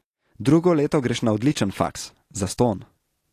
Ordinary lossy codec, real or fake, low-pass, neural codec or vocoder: AAC, 48 kbps; real; 14.4 kHz; none